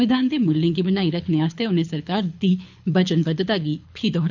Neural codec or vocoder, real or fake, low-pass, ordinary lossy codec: codec, 24 kHz, 6 kbps, HILCodec; fake; 7.2 kHz; none